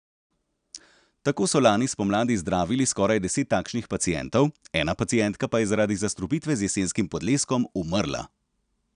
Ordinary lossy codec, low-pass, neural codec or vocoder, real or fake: none; 9.9 kHz; none; real